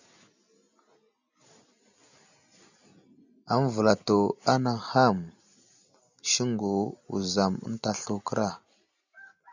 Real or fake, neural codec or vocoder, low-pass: real; none; 7.2 kHz